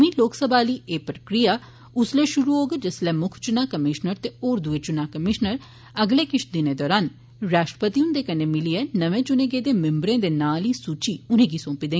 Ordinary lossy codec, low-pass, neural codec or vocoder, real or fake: none; none; none; real